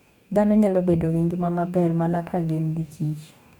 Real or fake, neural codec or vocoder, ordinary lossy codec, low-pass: fake; codec, 44.1 kHz, 2.6 kbps, DAC; none; 19.8 kHz